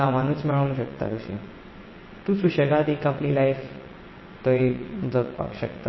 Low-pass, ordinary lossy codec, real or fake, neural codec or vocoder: 7.2 kHz; MP3, 24 kbps; fake; vocoder, 22.05 kHz, 80 mel bands, WaveNeXt